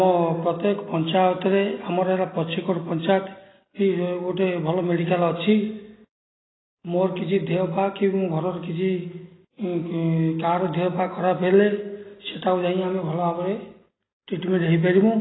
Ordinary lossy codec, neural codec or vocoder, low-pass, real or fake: AAC, 16 kbps; none; 7.2 kHz; real